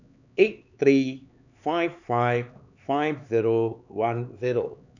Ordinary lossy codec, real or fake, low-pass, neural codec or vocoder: none; fake; 7.2 kHz; codec, 16 kHz, 4 kbps, X-Codec, HuBERT features, trained on LibriSpeech